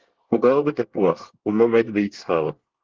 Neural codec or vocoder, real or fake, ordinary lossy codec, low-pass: codec, 24 kHz, 1 kbps, SNAC; fake; Opus, 16 kbps; 7.2 kHz